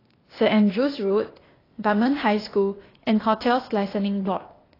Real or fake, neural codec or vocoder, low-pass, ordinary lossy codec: fake; codec, 16 kHz, 0.8 kbps, ZipCodec; 5.4 kHz; AAC, 24 kbps